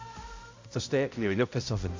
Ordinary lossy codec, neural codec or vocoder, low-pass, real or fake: MP3, 64 kbps; codec, 16 kHz, 0.5 kbps, X-Codec, HuBERT features, trained on balanced general audio; 7.2 kHz; fake